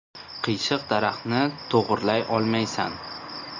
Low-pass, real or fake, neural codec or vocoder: 7.2 kHz; real; none